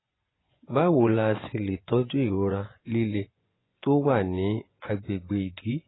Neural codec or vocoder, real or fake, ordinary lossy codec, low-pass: vocoder, 24 kHz, 100 mel bands, Vocos; fake; AAC, 16 kbps; 7.2 kHz